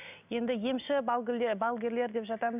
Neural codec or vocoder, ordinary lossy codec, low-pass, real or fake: none; none; 3.6 kHz; real